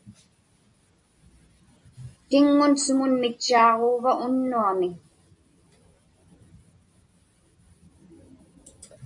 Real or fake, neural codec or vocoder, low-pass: real; none; 10.8 kHz